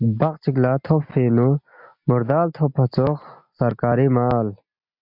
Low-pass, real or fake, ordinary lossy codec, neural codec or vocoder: 5.4 kHz; real; MP3, 48 kbps; none